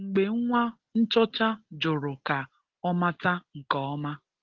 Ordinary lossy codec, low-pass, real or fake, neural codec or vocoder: Opus, 16 kbps; 7.2 kHz; real; none